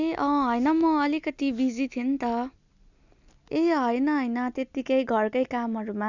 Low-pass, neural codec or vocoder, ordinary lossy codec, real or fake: 7.2 kHz; none; none; real